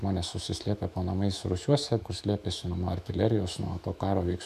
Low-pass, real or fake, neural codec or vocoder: 14.4 kHz; fake; autoencoder, 48 kHz, 128 numbers a frame, DAC-VAE, trained on Japanese speech